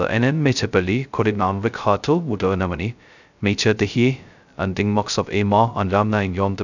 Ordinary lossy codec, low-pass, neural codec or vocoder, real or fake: none; 7.2 kHz; codec, 16 kHz, 0.2 kbps, FocalCodec; fake